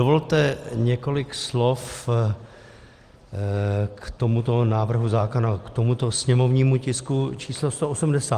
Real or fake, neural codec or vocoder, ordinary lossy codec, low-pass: real; none; Opus, 24 kbps; 14.4 kHz